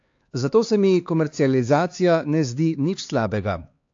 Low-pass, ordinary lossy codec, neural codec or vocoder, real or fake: 7.2 kHz; AAC, 48 kbps; codec, 16 kHz, 4 kbps, X-Codec, HuBERT features, trained on LibriSpeech; fake